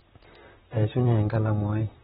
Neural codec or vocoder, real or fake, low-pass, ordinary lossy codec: vocoder, 44.1 kHz, 128 mel bands, Pupu-Vocoder; fake; 19.8 kHz; AAC, 16 kbps